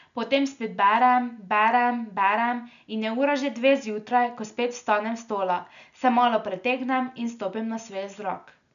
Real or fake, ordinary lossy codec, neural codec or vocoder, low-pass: real; none; none; 7.2 kHz